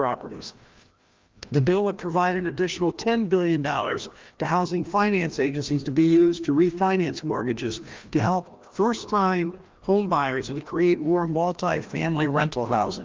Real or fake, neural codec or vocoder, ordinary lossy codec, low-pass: fake; codec, 16 kHz, 1 kbps, FreqCodec, larger model; Opus, 32 kbps; 7.2 kHz